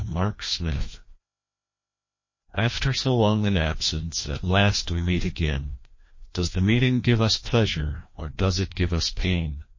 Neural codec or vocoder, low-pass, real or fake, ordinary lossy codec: codec, 16 kHz, 1 kbps, FreqCodec, larger model; 7.2 kHz; fake; MP3, 32 kbps